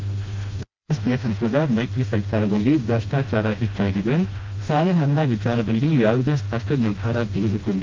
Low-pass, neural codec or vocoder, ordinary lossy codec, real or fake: 7.2 kHz; codec, 16 kHz, 1 kbps, FreqCodec, smaller model; Opus, 32 kbps; fake